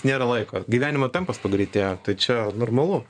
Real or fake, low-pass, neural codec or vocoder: fake; 9.9 kHz; vocoder, 24 kHz, 100 mel bands, Vocos